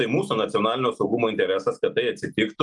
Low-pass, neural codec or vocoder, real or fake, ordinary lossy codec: 10.8 kHz; none; real; Opus, 32 kbps